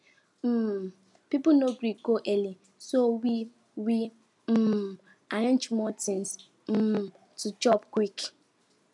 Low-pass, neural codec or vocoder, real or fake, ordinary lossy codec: 10.8 kHz; none; real; none